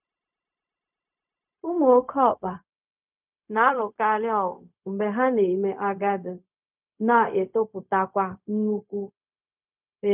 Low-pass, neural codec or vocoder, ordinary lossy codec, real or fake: 3.6 kHz; codec, 16 kHz, 0.4 kbps, LongCat-Audio-Codec; none; fake